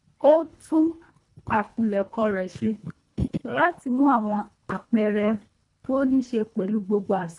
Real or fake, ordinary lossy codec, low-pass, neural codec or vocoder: fake; MP3, 64 kbps; 10.8 kHz; codec, 24 kHz, 1.5 kbps, HILCodec